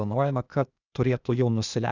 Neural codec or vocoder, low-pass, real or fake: codec, 16 kHz, 0.8 kbps, ZipCodec; 7.2 kHz; fake